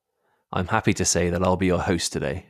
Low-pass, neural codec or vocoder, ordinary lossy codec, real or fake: 14.4 kHz; none; none; real